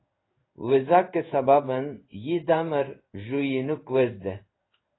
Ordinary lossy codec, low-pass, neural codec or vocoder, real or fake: AAC, 16 kbps; 7.2 kHz; codec, 16 kHz in and 24 kHz out, 1 kbps, XY-Tokenizer; fake